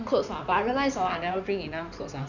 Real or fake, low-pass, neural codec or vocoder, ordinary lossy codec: fake; 7.2 kHz; codec, 16 kHz in and 24 kHz out, 2.2 kbps, FireRedTTS-2 codec; none